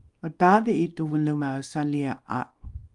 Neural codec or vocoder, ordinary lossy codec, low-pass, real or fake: codec, 24 kHz, 0.9 kbps, WavTokenizer, small release; Opus, 32 kbps; 10.8 kHz; fake